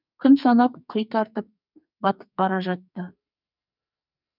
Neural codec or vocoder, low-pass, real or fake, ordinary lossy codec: codec, 44.1 kHz, 2.6 kbps, SNAC; 5.4 kHz; fake; none